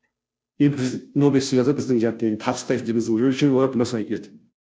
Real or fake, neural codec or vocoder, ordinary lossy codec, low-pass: fake; codec, 16 kHz, 0.5 kbps, FunCodec, trained on Chinese and English, 25 frames a second; none; none